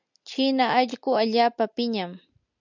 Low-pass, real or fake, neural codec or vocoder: 7.2 kHz; real; none